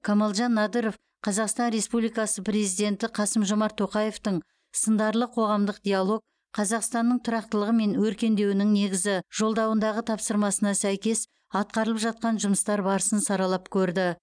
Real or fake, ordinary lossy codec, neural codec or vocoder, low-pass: real; none; none; 9.9 kHz